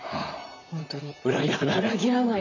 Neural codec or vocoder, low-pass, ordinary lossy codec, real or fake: vocoder, 44.1 kHz, 128 mel bands, Pupu-Vocoder; 7.2 kHz; none; fake